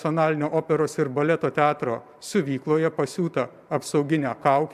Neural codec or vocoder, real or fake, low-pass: vocoder, 44.1 kHz, 128 mel bands every 512 samples, BigVGAN v2; fake; 14.4 kHz